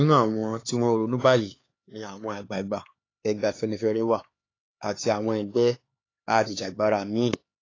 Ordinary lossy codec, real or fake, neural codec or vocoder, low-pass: AAC, 32 kbps; fake; codec, 16 kHz, 4 kbps, X-Codec, HuBERT features, trained on LibriSpeech; 7.2 kHz